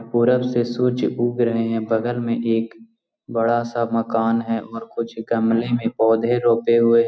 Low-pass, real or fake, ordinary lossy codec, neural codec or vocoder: none; real; none; none